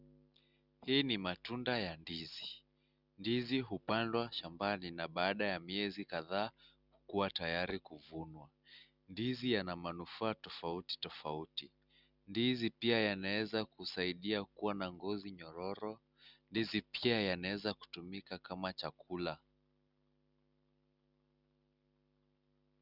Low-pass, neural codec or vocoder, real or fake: 5.4 kHz; none; real